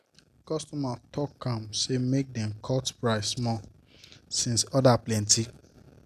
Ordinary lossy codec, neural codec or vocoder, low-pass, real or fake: none; none; 14.4 kHz; real